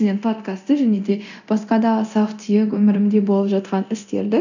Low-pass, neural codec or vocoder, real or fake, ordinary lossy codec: 7.2 kHz; codec, 24 kHz, 0.9 kbps, DualCodec; fake; none